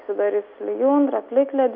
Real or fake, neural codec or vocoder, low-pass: real; none; 5.4 kHz